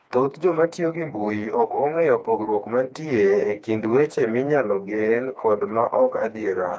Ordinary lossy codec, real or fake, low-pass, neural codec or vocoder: none; fake; none; codec, 16 kHz, 2 kbps, FreqCodec, smaller model